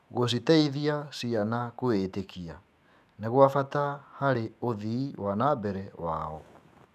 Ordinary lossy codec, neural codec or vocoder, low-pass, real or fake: none; autoencoder, 48 kHz, 128 numbers a frame, DAC-VAE, trained on Japanese speech; 14.4 kHz; fake